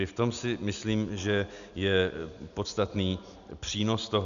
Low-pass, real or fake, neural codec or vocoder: 7.2 kHz; real; none